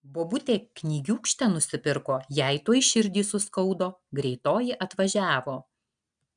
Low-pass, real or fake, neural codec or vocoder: 9.9 kHz; real; none